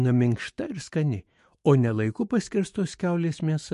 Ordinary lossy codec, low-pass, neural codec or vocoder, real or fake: MP3, 48 kbps; 14.4 kHz; autoencoder, 48 kHz, 128 numbers a frame, DAC-VAE, trained on Japanese speech; fake